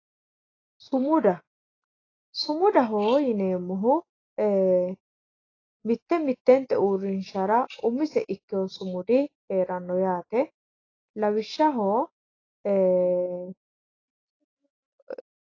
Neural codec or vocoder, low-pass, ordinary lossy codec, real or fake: none; 7.2 kHz; AAC, 32 kbps; real